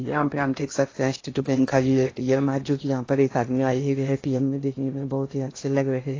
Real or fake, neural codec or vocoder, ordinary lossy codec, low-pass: fake; codec, 16 kHz in and 24 kHz out, 0.6 kbps, FocalCodec, streaming, 2048 codes; AAC, 32 kbps; 7.2 kHz